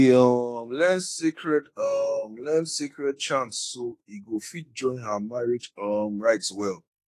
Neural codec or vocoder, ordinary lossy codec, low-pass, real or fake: autoencoder, 48 kHz, 32 numbers a frame, DAC-VAE, trained on Japanese speech; AAC, 48 kbps; 14.4 kHz; fake